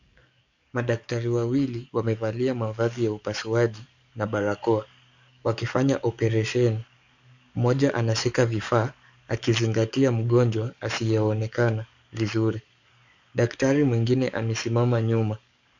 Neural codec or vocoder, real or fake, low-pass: codec, 44.1 kHz, 7.8 kbps, DAC; fake; 7.2 kHz